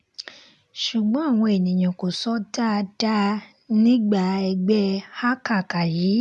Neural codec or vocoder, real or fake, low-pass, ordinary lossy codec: none; real; none; none